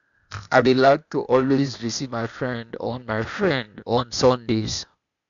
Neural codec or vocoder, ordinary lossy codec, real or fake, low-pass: codec, 16 kHz, 0.8 kbps, ZipCodec; none; fake; 7.2 kHz